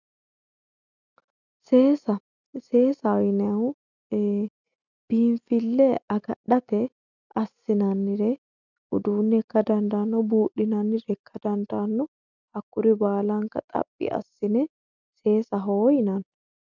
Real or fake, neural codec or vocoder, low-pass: real; none; 7.2 kHz